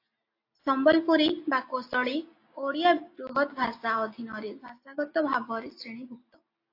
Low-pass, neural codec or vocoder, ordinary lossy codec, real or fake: 5.4 kHz; none; AAC, 32 kbps; real